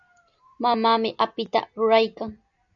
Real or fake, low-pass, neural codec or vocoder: real; 7.2 kHz; none